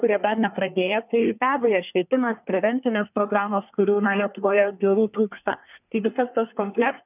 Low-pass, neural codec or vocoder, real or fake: 3.6 kHz; codec, 24 kHz, 1 kbps, SNAC; fake